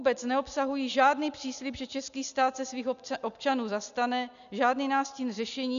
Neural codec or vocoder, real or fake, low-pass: none; real; 7.2 kHz